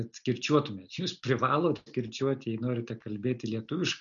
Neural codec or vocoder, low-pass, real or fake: none; 7.2 kHz; real